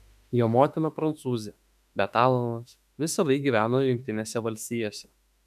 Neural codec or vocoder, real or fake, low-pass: autoencoder, 48 kHz, 32 numbers a frame, DAC-VAE, trained on Japanese speech; fake; 14.4 kHz